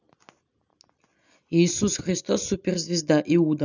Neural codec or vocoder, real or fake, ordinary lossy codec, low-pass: none; real; none; 7.2 kHz